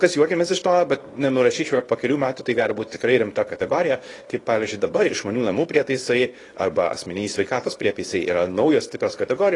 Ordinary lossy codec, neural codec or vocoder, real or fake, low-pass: AAC, 32 kbps; codec, 24 kHz, 0.9 kbps, WavTokenizer, small release; fake; 10.8 kHz